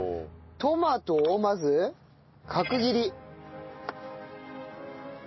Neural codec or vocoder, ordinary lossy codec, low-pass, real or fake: none; MP3, 24 kbps; 7.2 kHz; real